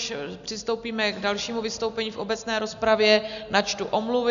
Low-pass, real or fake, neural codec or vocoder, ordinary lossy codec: 7.2 kHz; real; none; MP3, 96 kbps